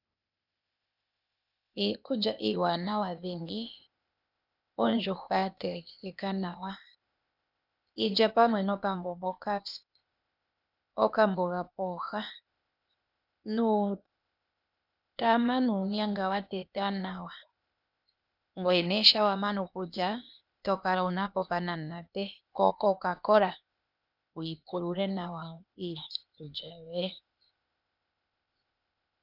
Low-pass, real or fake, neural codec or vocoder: 5.4 kHz; fake; codec, 16 kHz, 0.8 kbps, ZipCodec